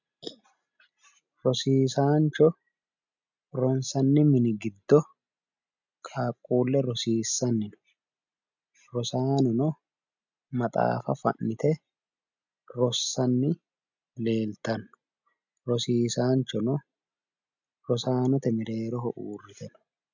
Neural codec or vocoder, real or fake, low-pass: none; real; 7.2 kHz